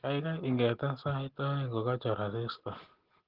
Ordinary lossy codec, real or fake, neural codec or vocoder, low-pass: Opus, 16 kbps; real; none; 5.4 kHz